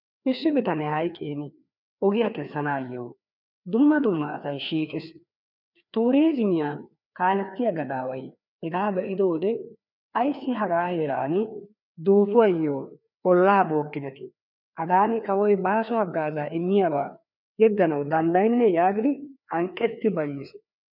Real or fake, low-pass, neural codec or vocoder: fake; 5.4 kHz; codec, 16 kHz, 2 kbps, FreqCodec, larger model